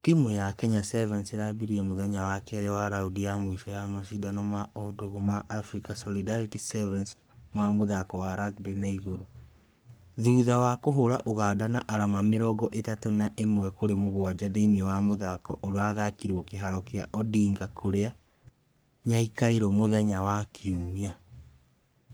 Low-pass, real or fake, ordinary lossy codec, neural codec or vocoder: none; fake; none; codec, 44.1 kHz, 3.4 kbps, Pupu-Codec